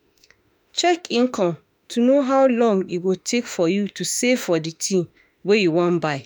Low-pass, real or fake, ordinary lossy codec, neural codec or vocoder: none; fake; none; autoencoder, 48 kHz, 32 numbers a frame, DAC-VAE, trained on Japanese speech